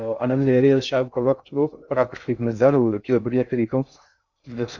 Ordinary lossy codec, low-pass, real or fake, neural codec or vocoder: Opus, 64 kbps; 7.2 kHz; fake; codec, 16 kHz in and 24 kHz out, 0.6 kbps, FocalCodec, streaming, 2048 codes